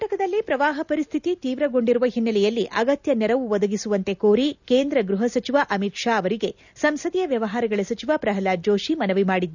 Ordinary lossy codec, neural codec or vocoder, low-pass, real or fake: none; none; 7.2 kHz; real